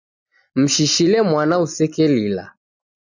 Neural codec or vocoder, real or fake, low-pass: none; real; 7.2 kHz